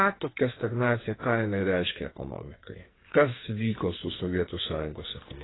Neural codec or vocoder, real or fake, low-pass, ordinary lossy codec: codec, 44.1 kHz, 2.6 kbps, SNAC; fake; 7.2 kHz; AAC, 16 kbps